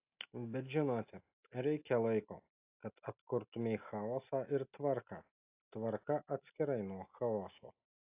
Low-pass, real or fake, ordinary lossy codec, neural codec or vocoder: 3.6 kHz; real; AAC, 32 kbps; none